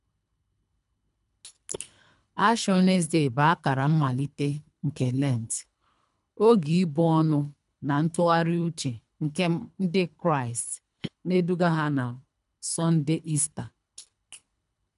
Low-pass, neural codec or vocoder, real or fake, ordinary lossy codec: 10.8 kHz; codec, 24 kHz, 3 kbps, HILCodec; fake; MP3, 96 kbps